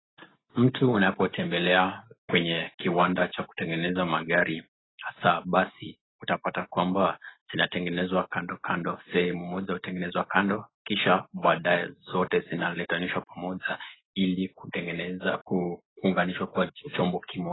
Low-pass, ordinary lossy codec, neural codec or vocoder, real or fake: 7.2 kHz; AAC, 16 kbps; none; real